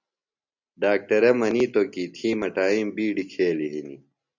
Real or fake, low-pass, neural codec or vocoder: real; 7.2 kHz; none